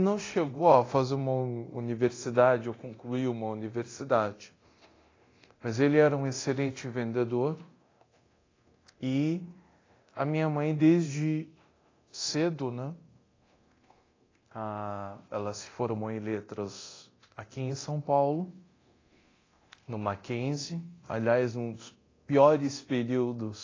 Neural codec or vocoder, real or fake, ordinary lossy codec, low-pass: codec, 24 kHz, 0.9 kbps, DualCodec; fake; AAC, 32 kbps; 7.2 kHz